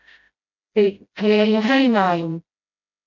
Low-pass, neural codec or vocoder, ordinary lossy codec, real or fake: 7.2 kHz; codec, 16 kHz, 0.5 kbps, FreqCodec, smaller model; none; fake